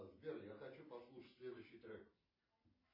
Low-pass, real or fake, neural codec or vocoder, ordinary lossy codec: 5.4 kHz; real; none; MP3, 24 kbps